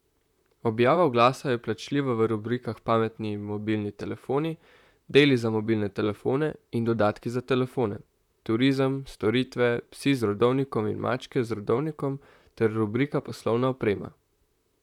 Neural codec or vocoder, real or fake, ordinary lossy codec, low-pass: vocoder, 44.1 kHz, 128 mel bands, Pupu-Vocoder; fake; none; 19.8 kHz